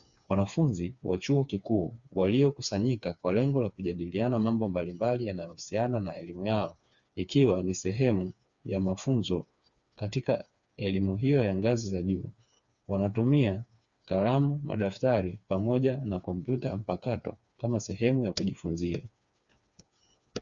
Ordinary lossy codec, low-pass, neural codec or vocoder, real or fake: Opus, 64 kbps; 7.2 kHz; codec, 16 kHz, 4 kbps, FreqCodec, smaller model; fake